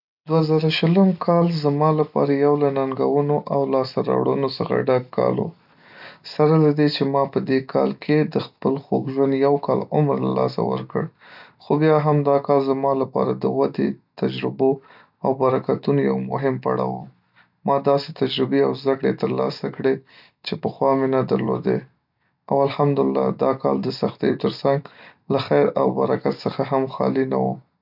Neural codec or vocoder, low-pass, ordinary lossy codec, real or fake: none; 5.4 kHz; none; real